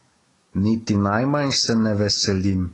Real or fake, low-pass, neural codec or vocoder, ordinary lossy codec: fake; 10.8 kHz; autoencoder, 48 kHz, 128 numbers a frame, DAC-VAE, trained on Japanese speech; AAC, 32 kbps